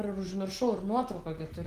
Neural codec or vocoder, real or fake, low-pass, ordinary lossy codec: none; real; 14.4 kHz; Opus, 16 kbps